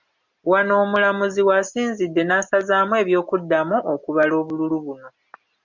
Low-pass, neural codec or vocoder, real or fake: 7.2 kHz; none; real